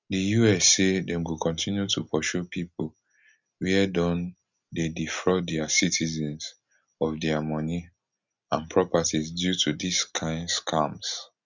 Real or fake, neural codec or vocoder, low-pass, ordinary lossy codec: real; none; 7.2 kHz; none